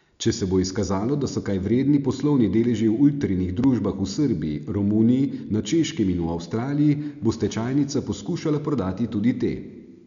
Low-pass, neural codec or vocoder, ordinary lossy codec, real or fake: 7.2 kHz; none; none; real